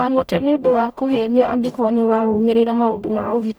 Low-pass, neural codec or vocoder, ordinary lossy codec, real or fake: none; codec, 44.1 kHz, 0.9 kbps, DAC; none; fake